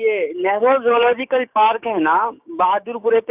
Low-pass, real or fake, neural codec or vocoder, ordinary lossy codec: 3.6 kHz; fake; codec, 16 kHz, 6 kbps, DAC; none